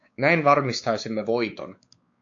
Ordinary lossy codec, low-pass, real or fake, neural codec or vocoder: AAC, 48 kbps; 7.2 kHz; fake; codec, 16 kHz, 4 kbps, X-Codec, WavLM features, trained on Multilingual LibriSpeech